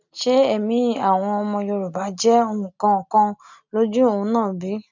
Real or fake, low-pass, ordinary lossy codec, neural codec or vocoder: real; 7.2 kHz; none; none